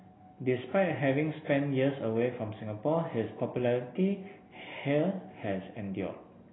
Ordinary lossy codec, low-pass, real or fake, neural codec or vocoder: AAC, 16 kbps; 7.2 kHz; real; none